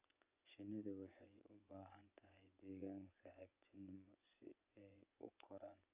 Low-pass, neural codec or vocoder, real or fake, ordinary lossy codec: 3.6 kHz; vocoder, 24 kHz, 100 mel bands, Vocos; fake; Opus, 64 kbps